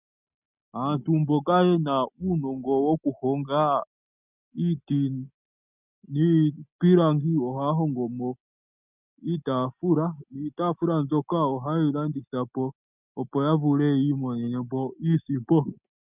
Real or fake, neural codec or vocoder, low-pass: real; none; 3.6 kHz